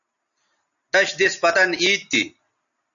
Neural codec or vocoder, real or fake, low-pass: none; real; 7.2 kHz